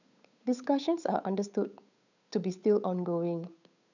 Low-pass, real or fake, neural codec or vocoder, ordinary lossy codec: 7.2 kHz; fake; codec, 16 kHz, 8 kbps, FunCodec, trained on Chinese and English, 25 frames a second; none